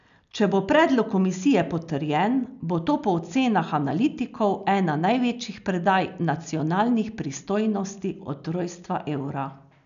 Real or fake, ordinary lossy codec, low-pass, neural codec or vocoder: real; none; 7.2 kHz; none